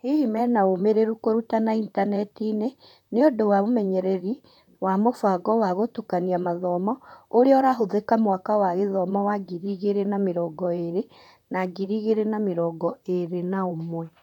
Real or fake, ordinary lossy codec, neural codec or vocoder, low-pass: fake; none; vocoder, 44.1 kHz, 128 mel bands, Pupu-Vocoder; 19.8 kHz